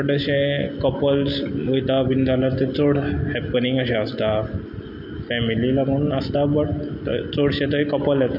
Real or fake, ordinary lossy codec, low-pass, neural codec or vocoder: real; MP3, 48 kbps; 5.4 kHz; none